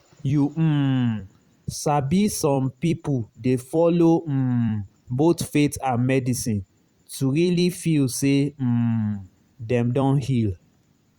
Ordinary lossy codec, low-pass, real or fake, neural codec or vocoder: Opus, 64 kbps; 19.8 kHz; fake; vocoder, 44.1 kHz, 128 mel bands every 256 samples, BigVGAN v2